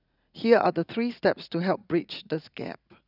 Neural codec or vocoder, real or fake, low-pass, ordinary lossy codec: vocoder, 44.1 kHz, 80 mel bands, Vocos; fake; 5.4 kHz; none